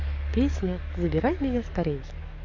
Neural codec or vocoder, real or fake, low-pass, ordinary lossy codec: codec, 44.1 kHz, 7.8 kbps, DAC; fake; 7.2 kHz; none